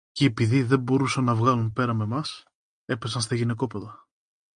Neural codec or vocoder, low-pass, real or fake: none; 9.9 kHz; real